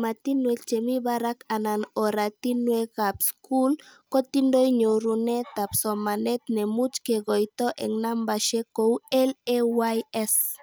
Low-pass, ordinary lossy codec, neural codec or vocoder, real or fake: none; none; none; real